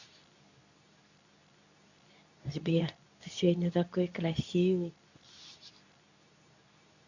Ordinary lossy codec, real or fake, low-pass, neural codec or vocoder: none; fake; 7.2 kHz; codec, 24 kHz, 0.9 kbps, WavTokenizer, medium speech release version 2